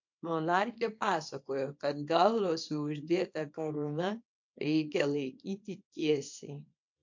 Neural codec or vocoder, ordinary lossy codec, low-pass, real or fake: codec, 24 kHz, 0.9 kbps, WavTokenizer, small release; MP3, 48 kbps; 7.2 kHz; fake